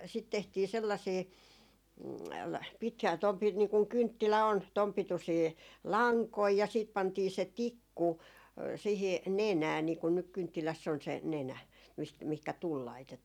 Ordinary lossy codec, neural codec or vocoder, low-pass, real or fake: none; none; 19.8 kHz; real